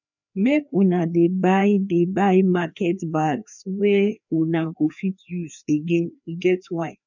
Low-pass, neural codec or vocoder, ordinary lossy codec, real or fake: 7.2 kHz; codec, 16 kHz, 2 kbps, FreqCodec, larger model; none; fake